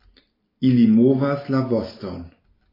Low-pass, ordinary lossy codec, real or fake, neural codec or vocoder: 5.4 kHz; AAC, 24 kbps; real; none